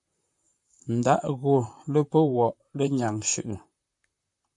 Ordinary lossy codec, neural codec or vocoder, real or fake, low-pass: AAC, 64 kbps; vocoder, 44.1 kHz, 128 mel bands, Pupu-Vocoder; fake; 10.8 kHz